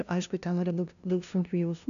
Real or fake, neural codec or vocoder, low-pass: fake; codec, 16 kHz, 0.5 kbps, FunCodec, trained on LibriTTS, 25 frames a second; 7.2 kHz